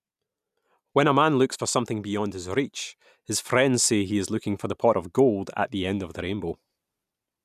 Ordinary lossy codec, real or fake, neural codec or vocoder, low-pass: none; real; none; 14.4 kHz